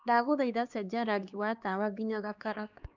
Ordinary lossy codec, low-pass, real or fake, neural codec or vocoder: none; 7.2 kHz; fake; codec, 16 kHz, 2 kbps, X-Codec, HuBERT features, trained on LibriSpeech